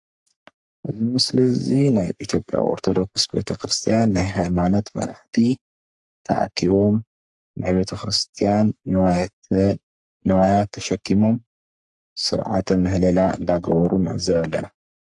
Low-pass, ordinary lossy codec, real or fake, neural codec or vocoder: 10.8 kHz; AAC, 64 kbps; fake; codec, 44.1 kHz, 3.4 kbps, Pupu-Codec